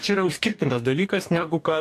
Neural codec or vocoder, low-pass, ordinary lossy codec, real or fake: codec, 44.1 kHz, 2.6 kbps, DAC; 14.4 kHz; AAC, 64 kbps; fake